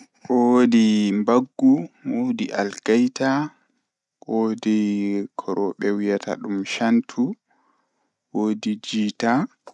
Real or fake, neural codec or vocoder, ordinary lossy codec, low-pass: real; none; none; 10.8 kHz